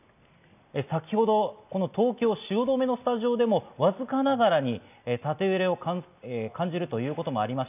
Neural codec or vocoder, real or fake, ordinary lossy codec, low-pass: none; real; none; 3.6 kHz